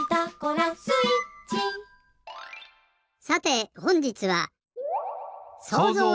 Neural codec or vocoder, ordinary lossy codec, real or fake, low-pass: none; none; real; none